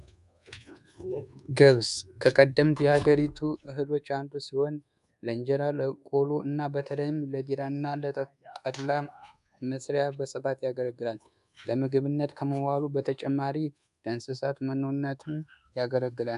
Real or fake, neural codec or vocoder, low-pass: fake; codec, 24 kHz, 1.2 kbps, DualCodec; 10.8 kHz